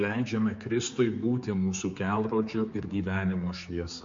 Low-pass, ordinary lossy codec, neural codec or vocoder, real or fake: 7.2 kHz; MP3, 48 kbps; codec, 16 kHz, 4 kbps, X-Codec, HuBERT features, trained on general audio; fake